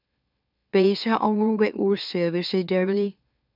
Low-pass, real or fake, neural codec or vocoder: 5.4 kHz; fake; autoencoder, 44.1 kHz, a latent of 192 numbers a frame, MeloTTS